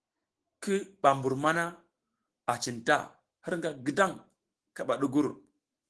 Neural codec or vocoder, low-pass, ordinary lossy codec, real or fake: autoencoder, 48 kHz, 128 numbers a frame, DAC-VAE, trained on Japanese speech; 10.8 kHz; Opus, 16 kbps; fake